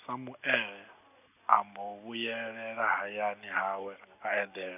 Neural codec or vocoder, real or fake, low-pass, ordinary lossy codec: none; real; 3.6 kHz; none